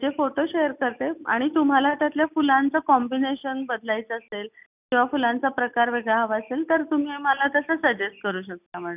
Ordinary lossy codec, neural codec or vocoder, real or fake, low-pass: none; none; real; 3.6 kHz